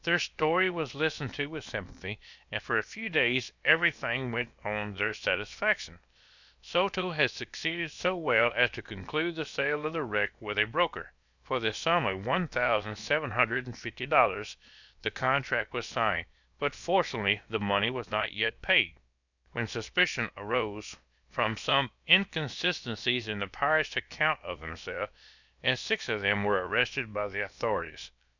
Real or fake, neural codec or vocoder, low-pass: fake; codec, 16 kHz, about 1 kbps, DyCAST, with the encoder's durations; 7.2 kHz